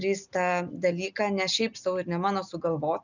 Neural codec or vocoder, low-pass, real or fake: none; 7.2 kHz; real